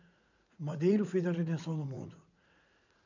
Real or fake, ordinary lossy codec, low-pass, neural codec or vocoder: fake; none; 7.2 kHz; vocoder, 44.1 kHz, 80 mel bands, Vocos